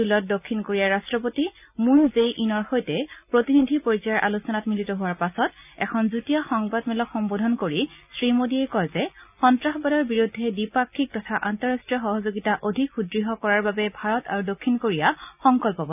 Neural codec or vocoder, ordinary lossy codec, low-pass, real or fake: none; none; 3.6 kHz; real